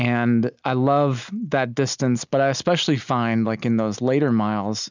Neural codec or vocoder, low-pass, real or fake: none; 7.2 kHz; real